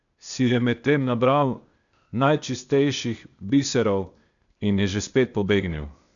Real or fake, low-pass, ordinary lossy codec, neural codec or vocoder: fake; 7.2 kHz; MP3, 96 kbps; codec, 16 kHz, 0.8 kbps, ZipCodec